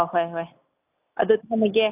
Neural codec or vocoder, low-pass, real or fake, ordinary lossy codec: none; 3.6 kHz; real; AAC, 24 kbps